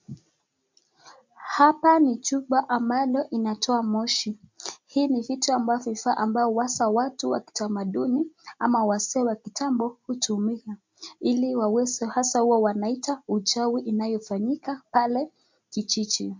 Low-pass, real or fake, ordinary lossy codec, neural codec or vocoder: 7.2 kHz; real; MP3, 48 kbps; none